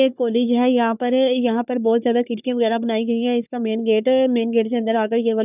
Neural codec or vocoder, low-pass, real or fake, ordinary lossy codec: codec, 44.1 kHz, 3.4 kbps, Pupu-Codec; 3.6 kHz; fake; none